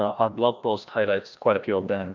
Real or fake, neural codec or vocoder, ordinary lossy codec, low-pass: fake; codec, 16 kHz, 1 kbps, FreqCodec, larger model; MP3, 64 kbps; 7.2 kHz